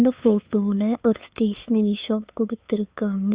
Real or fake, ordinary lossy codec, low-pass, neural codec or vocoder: fake; Opus, 64 kbps; 3.6 kHz; codec, 16 kHz, 2 kbps, FunCodec, trained on LibriTTS, 25 frames a second